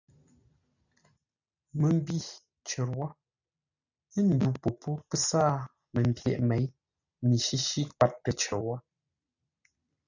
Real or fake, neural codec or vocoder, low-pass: real; none; 7.2 kHz